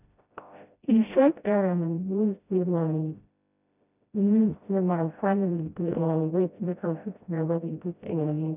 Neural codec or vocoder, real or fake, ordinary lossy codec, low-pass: codec, 16 kHz, 0.5 kbps, FreqCodec, smaller model; fake; none; 3.6 kHz